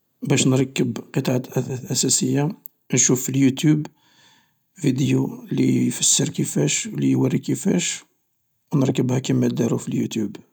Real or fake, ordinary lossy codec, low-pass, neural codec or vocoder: real; none; none; none